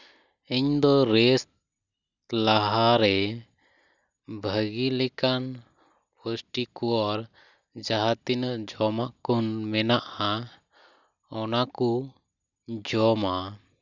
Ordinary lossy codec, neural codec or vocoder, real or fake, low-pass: none; none; real; 7.2 kHz